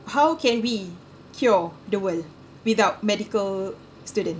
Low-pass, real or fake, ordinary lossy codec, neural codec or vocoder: none; real; none; none